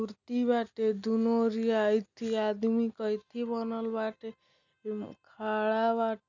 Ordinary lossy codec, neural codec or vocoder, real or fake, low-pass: none; none; real; 7.2 kHz